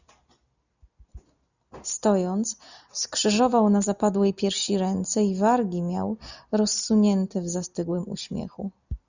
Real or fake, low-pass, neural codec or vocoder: real; 7.2 kHz; none